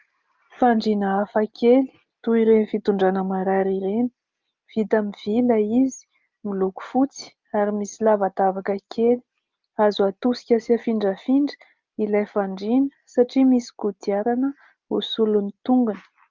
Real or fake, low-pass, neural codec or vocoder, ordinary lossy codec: real; 7.2 kHz; none; Opus, 24 kbps